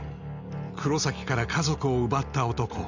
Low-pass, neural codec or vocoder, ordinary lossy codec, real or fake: 7.2 kHz; none; Opus, 64 kbps; real